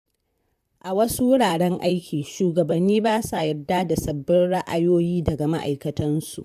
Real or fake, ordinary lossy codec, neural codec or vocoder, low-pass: fake; none; vocoder, 44.1 kHz, 128 mel bands, Pupu-Vocoder; 14.4 kHz